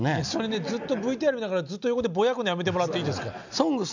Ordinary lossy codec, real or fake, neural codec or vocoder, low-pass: none; real; none; 7.2 kHz